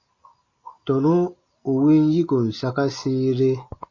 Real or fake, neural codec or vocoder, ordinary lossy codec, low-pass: real; none; MP3, 32 kbps; 7.2 kHz